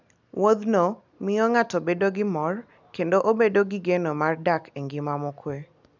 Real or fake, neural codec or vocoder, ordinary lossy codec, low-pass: real; none; none; 7.2 kHz